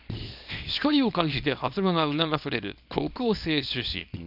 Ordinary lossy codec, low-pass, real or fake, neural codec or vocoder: none; 5.4 kHz; fake; codec, 24 kHz, 0.9 kbps, WavTokenizer, small release